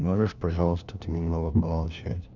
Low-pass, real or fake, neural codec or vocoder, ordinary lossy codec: 7.2 kHz; fake; codec, 16 kHz, 1 kbps, FunCodec, trained on LibriTTS, 50 frames a second; none